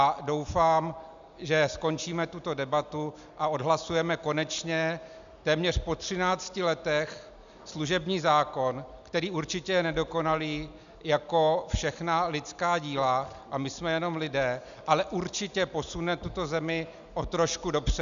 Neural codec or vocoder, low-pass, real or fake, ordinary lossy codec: none; 7.2 kHz; real; MP3, 96 kbps